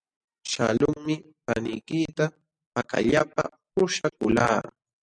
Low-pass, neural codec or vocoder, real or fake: 9.9 kHz; none; real